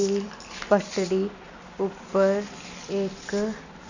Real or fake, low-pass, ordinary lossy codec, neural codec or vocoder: real; 7.2 kHz; none; none